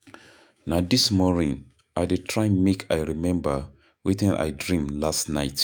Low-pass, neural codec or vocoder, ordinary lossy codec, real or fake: none; autoencoder, 48 kHz, 128 numbers a frame, DAC-VAE, trained on Japanese speech; none; fake